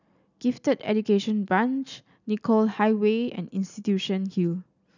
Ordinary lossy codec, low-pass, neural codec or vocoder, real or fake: none; 7.2 kHz; none; real